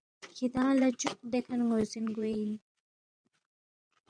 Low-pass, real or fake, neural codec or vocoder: 9.9 kHz; fake; vocoder, 22.05 kHz, 80 mel bands, Vocos